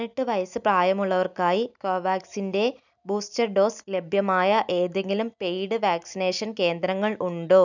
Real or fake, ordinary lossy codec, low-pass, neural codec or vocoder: real; none; 7.2 kHz; none